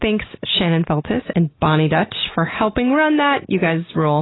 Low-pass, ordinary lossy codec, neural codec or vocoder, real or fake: 7.2 kHz; AAC, 16 kbps; none; real